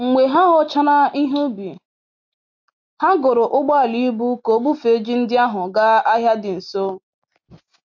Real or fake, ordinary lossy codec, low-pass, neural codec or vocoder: real; MP3, 48 kbps; 7.2 kHz; none